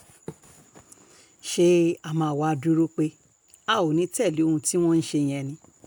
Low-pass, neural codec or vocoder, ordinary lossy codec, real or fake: none; none; none; real